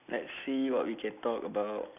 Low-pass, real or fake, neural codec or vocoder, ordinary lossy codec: 3.6 kHz; real; none; none